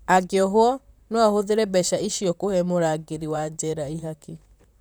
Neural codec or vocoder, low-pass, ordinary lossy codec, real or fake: vocoder, 44.1 kHz, 128 mel bands, Pupu-Vocoder; none; none; fake